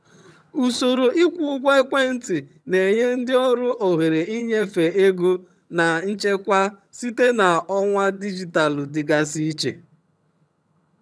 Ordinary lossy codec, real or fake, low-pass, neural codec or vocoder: none; fake; none; vocoder, 22.05 kHz, 80 mel bands, HiFi-GAN